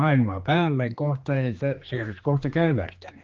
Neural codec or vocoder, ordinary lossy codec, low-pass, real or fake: codec, 16 kHz, 2 kbps, X-Codec, HuBERT features, trained on general audio; Opus, 24 kbps; 7.2 kHz; fake